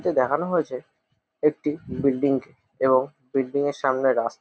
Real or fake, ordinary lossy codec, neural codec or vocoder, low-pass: real; none; none; none